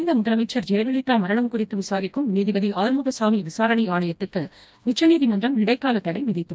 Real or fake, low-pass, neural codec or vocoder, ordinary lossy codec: fake; none; codec, 16 kHz, 1 kbps, FreqCodec, smaller model; none